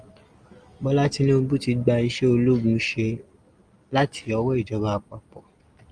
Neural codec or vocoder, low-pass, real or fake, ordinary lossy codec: none; 9.9 kHz; real; Opus, 32 kbps